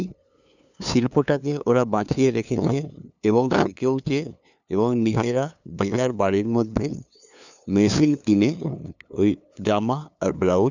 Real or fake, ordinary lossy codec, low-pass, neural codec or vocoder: fake; none; 7.2 kHz; codec, 16 kHz, 2 kbps, FunCodec, trained on LibriTTS, 25 frames a second